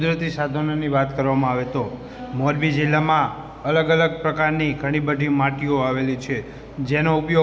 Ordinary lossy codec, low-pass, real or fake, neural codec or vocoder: none; none; real; none